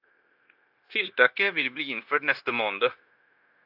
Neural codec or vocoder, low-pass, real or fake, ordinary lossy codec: codec, 16 kHz, 0.9 kbps, LongCat-Audio-Codec; 5.4 kHz; fake; AAC, 48 kbps